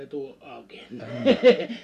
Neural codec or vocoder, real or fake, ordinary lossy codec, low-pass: none; real; none; 14.4 kHz